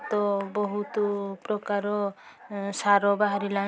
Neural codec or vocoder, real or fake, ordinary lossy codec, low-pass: none; real; none; none